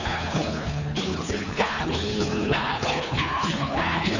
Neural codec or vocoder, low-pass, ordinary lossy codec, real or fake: codec, 24 kHz, 3 kbps, HILCodec; 7.2 kHz; none; fake